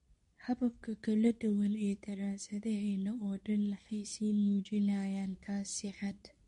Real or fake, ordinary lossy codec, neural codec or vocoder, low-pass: fake; MP3, 48 kbps; codec, 24 kHz, 0.9 kbps, WavTokenizer, medium speech release version 2; 10.8 kHz